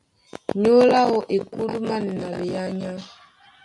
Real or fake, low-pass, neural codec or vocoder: real; 10.8 kHz; none